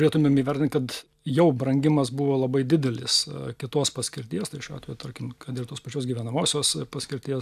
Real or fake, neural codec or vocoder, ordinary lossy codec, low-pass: real; none; AAC, 96 kbps; 14.4 kHz